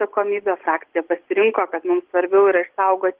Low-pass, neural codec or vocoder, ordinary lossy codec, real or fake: 3.6 kHz; none; Opus, 16 kbps; real